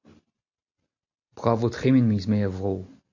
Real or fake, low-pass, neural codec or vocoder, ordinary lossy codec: real; 7.2 kHz; none; AAC, 48 kbps